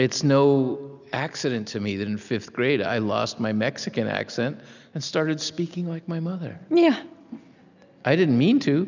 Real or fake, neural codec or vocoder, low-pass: real; none; 7.2 kHz